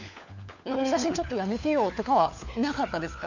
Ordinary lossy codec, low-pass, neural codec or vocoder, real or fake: none; 7.2 kHz; codec, 16 kHz, 4 kbps, FunCodec, trained on LibriTTS, 50 frames a second; fake